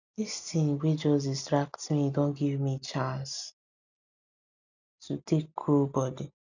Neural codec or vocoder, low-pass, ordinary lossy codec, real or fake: none; 7.2 kHz; none; real